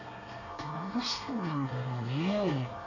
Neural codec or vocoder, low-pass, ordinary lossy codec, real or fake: codec, 24 kHz, 1 kbps, SNAC; 7.2 kHz; none; fake